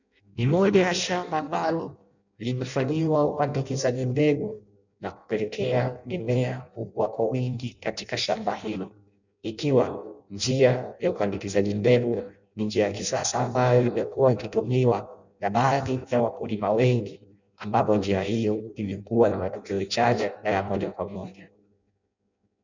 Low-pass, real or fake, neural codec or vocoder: 7.2 kHz; fake; codec, 16 kHz in and 24 kHz out, 0.6 kbps, FireRedTTS-2 codec